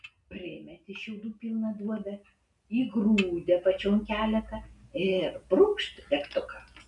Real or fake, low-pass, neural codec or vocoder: real; 10.8 kHz; none